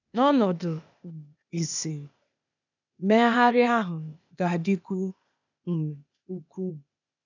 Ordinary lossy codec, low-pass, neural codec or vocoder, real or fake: none; 7.2 kHz; codec, 16 kHz, 0.8 kbps, ZipCodec; fake